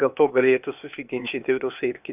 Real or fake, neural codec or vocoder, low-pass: fake; codec, 16 kHz, 0.8 kbps, ZipCodec; 3.6 kHz